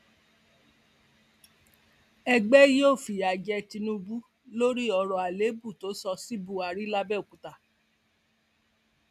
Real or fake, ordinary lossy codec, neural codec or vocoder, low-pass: real; none; none; 14.4 kHz